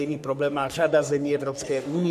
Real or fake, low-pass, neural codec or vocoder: fake; 14.4 kHz; codec, 44.1 kHz, 3.4 kbps, Pupu-Codec